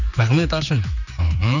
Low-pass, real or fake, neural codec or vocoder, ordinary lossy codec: 7.2 kHz; fake; codec, 16 kHz, 4 kbps, X-Codec, HuBERT features, trained on general audio; none